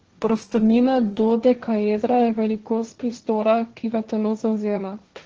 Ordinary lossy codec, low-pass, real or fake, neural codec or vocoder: Opus, 16 kbps; 7.2 kHz; fake; codec, 16 kHz, 1.1 kbps, Voila-Tokenizer